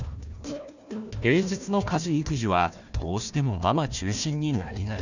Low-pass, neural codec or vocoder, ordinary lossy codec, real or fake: 7.2 kHz; codec, 16 kHz, 1 kbps, FunCodec, trained on Chinese and English, 50 frames a second; none; fake